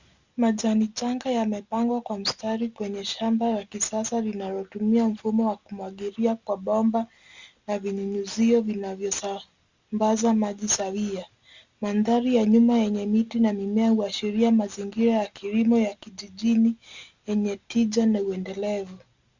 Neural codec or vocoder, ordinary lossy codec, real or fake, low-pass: none; Opus, 64 kbps; real; 7.2 kHz